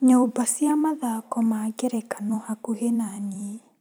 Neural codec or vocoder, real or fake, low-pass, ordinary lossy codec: vocoder, 44.1 kHz, 128 mel bands every 256 samples, BigVGAN v2; fake; none; none